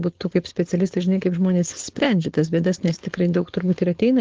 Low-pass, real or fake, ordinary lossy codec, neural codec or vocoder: 7.2 kHz; fake; Opus, 16 kbps; codec, 16 kHz, 4.8 kbps, FACodec